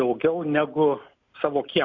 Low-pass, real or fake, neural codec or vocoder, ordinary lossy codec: 7.2 kHz; real; none; MP3, 48 kbps